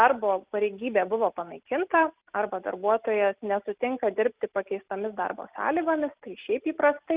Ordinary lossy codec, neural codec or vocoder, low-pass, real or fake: Opus, 24 kbps; none; 3.6 kHz; real